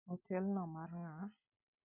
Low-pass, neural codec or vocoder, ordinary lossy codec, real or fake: 3.6 kHz; vocoder, 44.1 kHz, 128 mel bands every 256 samples, BigVGAN v2; none; fake